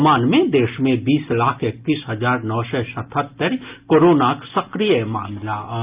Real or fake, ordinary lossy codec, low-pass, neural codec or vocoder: real; Opus, 24 kbps; 3.6 kHz; none